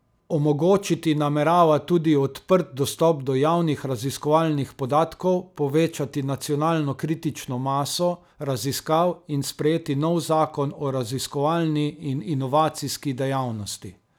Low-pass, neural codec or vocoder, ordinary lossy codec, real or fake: none; none; none; real